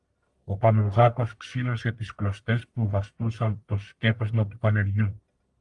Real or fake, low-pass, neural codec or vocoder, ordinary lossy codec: fake; 10.8 kHz; codec, 44.1 kHz, 1.7 kbps, Pupu-Codec; Opus, 32 kbps